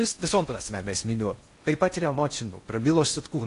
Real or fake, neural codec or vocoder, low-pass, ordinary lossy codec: fake; codec, 16 kHz in and 24 kHz out, 0.6 kbps, FocalCodec, streaming, 4096 codes; 10.8 kHz; AAC, 48 kbps